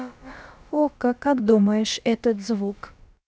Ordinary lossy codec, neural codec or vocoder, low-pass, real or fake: none; codec, 16 kHz, about 1 kbps, DyCAST, with the encoder's durations; none; fake